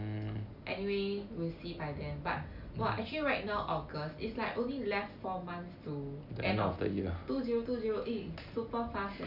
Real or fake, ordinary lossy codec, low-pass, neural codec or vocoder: real; none; 5.4 kHz; none